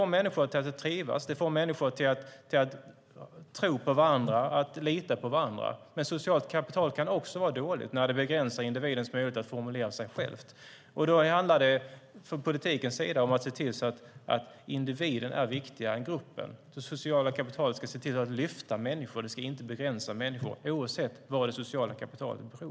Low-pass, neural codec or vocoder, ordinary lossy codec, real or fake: none; none; none; real